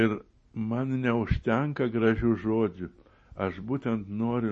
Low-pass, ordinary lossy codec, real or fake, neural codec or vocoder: 10.8 kHz; MP3, 32 kbps; real; none